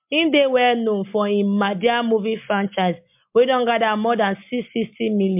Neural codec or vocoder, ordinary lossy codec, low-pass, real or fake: none; MP3, 32 kbps; 3.6 kHz; real